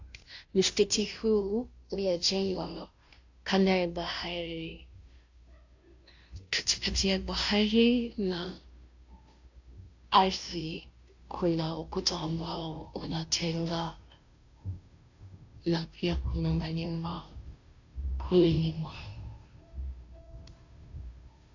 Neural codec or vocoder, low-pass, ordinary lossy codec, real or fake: codec, 16 kHz, 0.5 kbps, FunCodec, trained on Chinese and English, 25 frames a second; 7.2 kHz; AAC, 48 kbps; fake